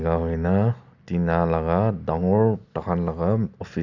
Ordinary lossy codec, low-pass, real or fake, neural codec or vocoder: none; 7.2 kHz; real; none